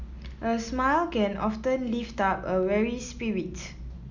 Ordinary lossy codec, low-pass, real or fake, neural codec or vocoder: none; 7.2 kHz; real; none